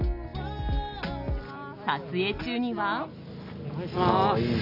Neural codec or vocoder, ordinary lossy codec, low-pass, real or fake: none; none; 5.4 kHz; real